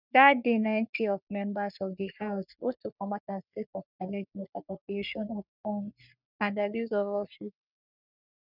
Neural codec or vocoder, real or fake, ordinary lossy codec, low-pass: codec, 44.1 kHz, 3.4 kbps, Pupu-Codec; fake; none; 5.4 kHz